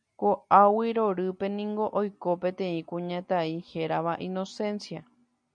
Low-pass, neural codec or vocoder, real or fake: 9.9 kHz; none; real